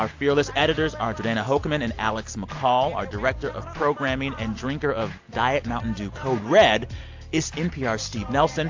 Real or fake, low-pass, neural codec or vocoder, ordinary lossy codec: real; 7.2 kHz; none; AAC, 48 kbps